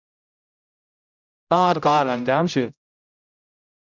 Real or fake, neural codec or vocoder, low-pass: fake; codec, 16 kHz, 0.5 kbps, X-Codec, HuBERT features, trained on general audio; 7.2 kHz